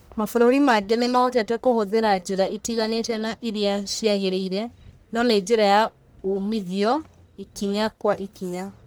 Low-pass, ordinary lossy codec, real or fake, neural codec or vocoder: none; none; fake; codec, 44.1 kHz, 1.7 kbps, Pupu-Codec